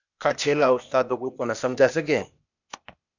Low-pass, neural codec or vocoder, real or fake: 7.2 kHz; codec, 16 kHz, 0.8 kbps, ZipCodec; fake